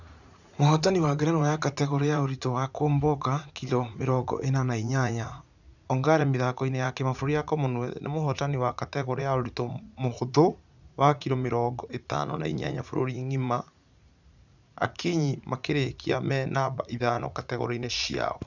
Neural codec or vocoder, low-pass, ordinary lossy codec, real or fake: vocoder, 44.1 kHz, 80 mel bands, Vocos; 7.2 kHz; none; fake